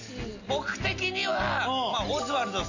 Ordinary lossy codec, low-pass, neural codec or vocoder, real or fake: none; 7.2 kHz; none; real